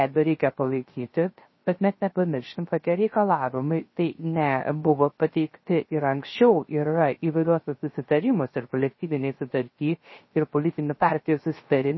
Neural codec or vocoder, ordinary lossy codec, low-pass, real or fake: codec, 16 kHz, 0.3 kbps, FocalCodec; MP3, 24 kbps; 7.2 kHz; fake